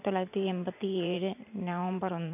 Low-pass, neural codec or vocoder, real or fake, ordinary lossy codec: 3.6 kHz; vocoder, 44.1 kHz, 128 mel bands every 512 samples, BigVGAN v2; fake; none